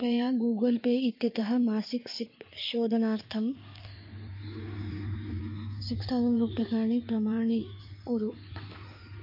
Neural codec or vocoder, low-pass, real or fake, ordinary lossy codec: codec, 16 kHz in and 24 kHz out, 2.2 kbps, FireRedTTS-2 codec; 5.4 kHz; fake; MP3, 32 kbps